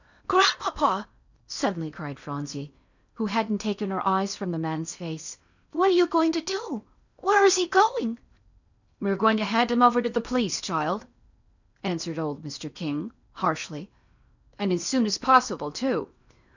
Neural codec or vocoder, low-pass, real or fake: codec, 16 kHz in and 24 kHz out, 0.8 kbps, FocalCodec, streaming, 65536 codes; 7.2 kHz; fake